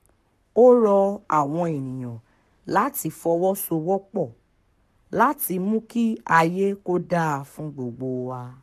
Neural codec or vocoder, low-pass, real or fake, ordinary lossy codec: codec, 44.1 kHz, 7.8 kbps, Pupu-Codec; 14.4 kHz; fake; AAC, 96 kbps